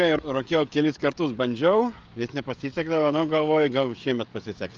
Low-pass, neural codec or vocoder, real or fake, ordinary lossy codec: 7.2 kHz; codec, 16 kHz, 16 kbps, FreqCodec, smaller model; fake; Opus, 32 kbps